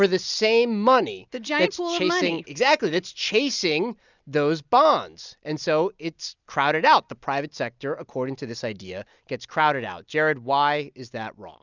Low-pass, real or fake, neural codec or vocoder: 7.2 kHz; real; none